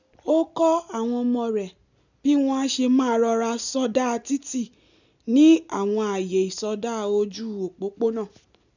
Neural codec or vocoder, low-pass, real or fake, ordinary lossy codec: none; 7.2 kHz; real; none